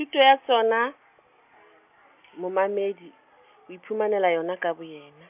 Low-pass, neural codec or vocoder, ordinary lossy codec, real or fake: 3.6 kHz; none; none; real